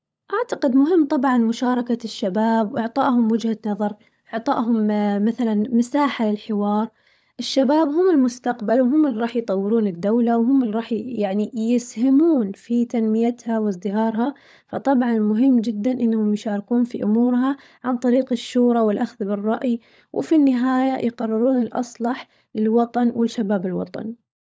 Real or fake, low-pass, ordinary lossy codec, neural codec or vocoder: fake; none; none; codec, 16 kHz, 16 kbps, FunCodec, trained on LibriTTS, 50 frames a second